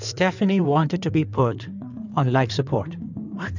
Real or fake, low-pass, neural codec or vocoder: fake; 7.2 kHz; codec, 16 kHz in and 24 kHz out, 2.2 kbps, FireRedTTS-2 codec